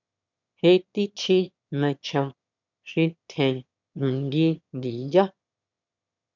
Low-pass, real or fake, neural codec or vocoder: 7.2 kHz; fake; autoencoder, 22.05 kHz, a latent of 192 numbers a frame, VITS, trained on one speaker